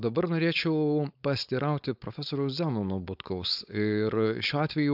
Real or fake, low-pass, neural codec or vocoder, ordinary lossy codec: fake; 5.4 kHz; codec, 16 kHz, 4.8 kbps, FACodec; AAC, 48 kbps